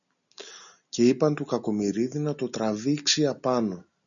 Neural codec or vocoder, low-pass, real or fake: none; 7.2 kHz; real